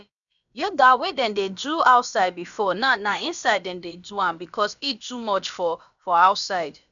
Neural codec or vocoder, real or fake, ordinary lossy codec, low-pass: codec, 16 kHz, about 1 kbps, DyCAST, with the encoder's durations; fake; none; 7.2 kHz